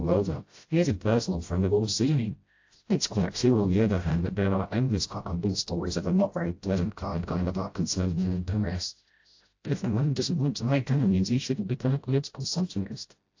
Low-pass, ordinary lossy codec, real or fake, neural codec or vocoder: 7.2 kHz; AAC, 48 kbps; fake; codec, 16 kHz, 0.5 kbps, FreqCodec, smaller model